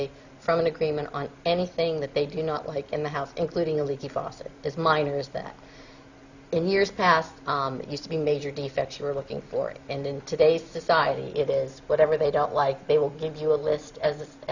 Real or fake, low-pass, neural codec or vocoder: real; 7.2 kHz; none